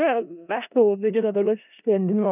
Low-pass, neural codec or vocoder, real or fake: 3.6 kHz; codec, 16 kHz in and 24 kHz out, 0.4 kbps, LongCat-Audio-Codec, four codebook decoder; fake